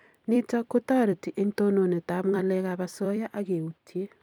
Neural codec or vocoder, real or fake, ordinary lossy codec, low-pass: vocoder, 44.1 kHz, 128 mel bands every 256 samples, BigVGAN v2; fake; none; 19.8 kHz